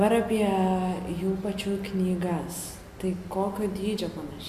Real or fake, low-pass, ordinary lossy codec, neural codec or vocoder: real; 14.4 kHz; MP3, 96 kbps; none